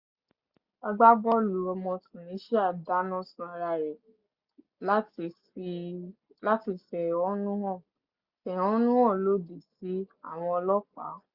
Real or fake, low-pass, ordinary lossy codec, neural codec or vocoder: real; 5.4 kHz; Opus, 64 kbps; none